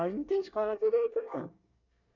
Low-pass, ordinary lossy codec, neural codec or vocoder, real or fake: 7.2 kHz; none; codec, 24 kHz, 1 kbps, SNAC; fake